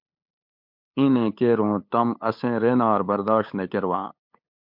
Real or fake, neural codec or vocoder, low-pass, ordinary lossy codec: fake; codec, 16 kHz, 8 kbps, FunCodec, trained on LibriTTS, 25 frames a second; 5.4 kHz; MP3, 48 kbps